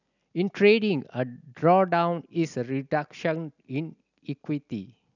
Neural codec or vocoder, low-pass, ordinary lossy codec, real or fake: none; 7.2 kHz; none; real